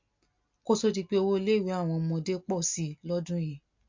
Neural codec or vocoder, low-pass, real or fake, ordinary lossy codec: none; 7.2 kHz; real; MP3, 48 kbps